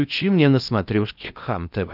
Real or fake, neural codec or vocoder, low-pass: fake; codec, 16 kHz in and 24 kHz out, 0.8 kbps, FocalCodec, streaming, 65536 codes; 5.4 kHz